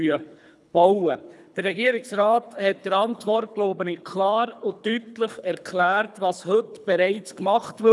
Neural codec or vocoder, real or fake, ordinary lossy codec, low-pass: codec, 24 kHz, 3 kbps, HILCodec; fake; none; none